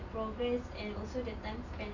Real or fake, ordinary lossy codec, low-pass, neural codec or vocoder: real; none; 7.2 kHz; none